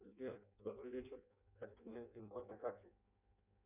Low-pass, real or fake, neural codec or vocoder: 3.6 kHz; fake; codec, 16 kHz in and 24 kHz out, 0.6 kbps, FireRedTTS-2 codec